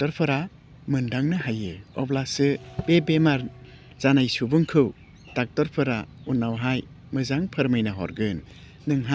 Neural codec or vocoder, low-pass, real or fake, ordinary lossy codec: none; none; real; none